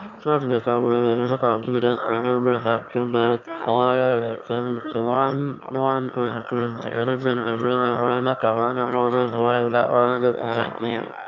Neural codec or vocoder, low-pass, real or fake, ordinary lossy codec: autoencoder, 22.05 kHz, a latent of 192 numbers a frame, VITS, trained on one speaker; 7.2 kHz; fake; none